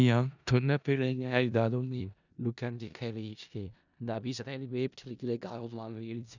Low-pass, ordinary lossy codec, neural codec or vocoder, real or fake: 7.2 kHz; none; codec, 16 kHz in and 24 kHz out, 0.4 kbps, LongCat-Audio-Codec, four codebook decoder; fake